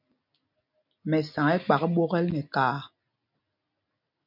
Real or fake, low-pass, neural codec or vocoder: real; 5.4 kHz; none